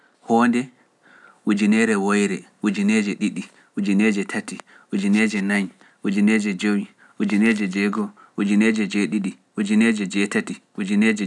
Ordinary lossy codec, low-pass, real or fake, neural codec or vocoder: none; none; real; none